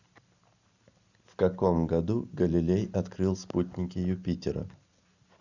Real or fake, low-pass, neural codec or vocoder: real; 7.2 kHz; none